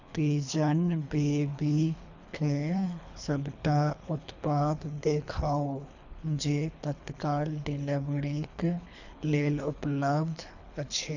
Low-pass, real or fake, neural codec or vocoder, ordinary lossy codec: 7.2 kHz; fake; codec, 24 kHz, 3 kbps, HILCodec; none